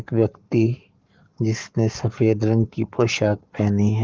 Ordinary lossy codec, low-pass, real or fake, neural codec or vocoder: Opus, 32 kbps; 7.2 kHz; fake; codec, 44.1 kHz, 7.8 kbps, Pupu-Codec